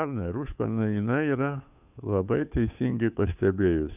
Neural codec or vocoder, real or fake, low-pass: codec, 16 kHz, 2 kbps, FunCodec, trained on Chinese and English, 25 frames a second; fake; 3.6 kHz